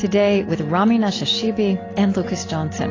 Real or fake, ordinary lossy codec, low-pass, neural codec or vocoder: real; AAC, 32 kbps; 7.2 kHz; none